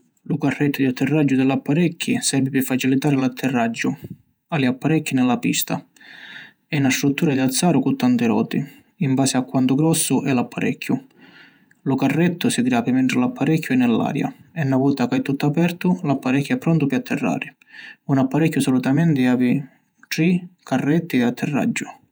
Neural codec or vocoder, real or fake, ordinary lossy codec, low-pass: vocoder, 48 kHz, 128 mel bands, Vocos; fake; none; none